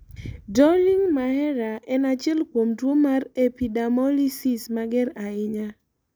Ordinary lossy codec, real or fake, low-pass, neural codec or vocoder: none; real; none; none